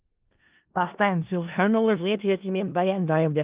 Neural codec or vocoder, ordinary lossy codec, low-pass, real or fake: codec, 16 kHz in and 24 kHz out, 0.4 kbps, LongCat-Audio-Codec, four codebook decoder; Opus, 32 kbps; 3.6 kHz; fake